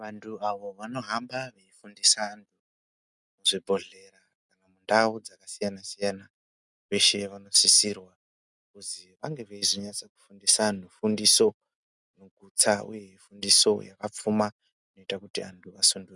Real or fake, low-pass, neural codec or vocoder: real; 10.8 kHz; none